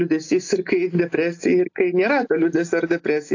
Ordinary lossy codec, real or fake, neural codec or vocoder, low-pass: AAC, 48 kbps; real; none; 7.2 kHz